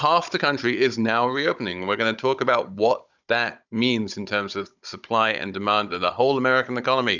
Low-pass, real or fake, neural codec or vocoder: 7.2 kHz; fake; codec, 16 kHz, 16 kbps, FunCodec, trained on Chinese and English, 50 frames a second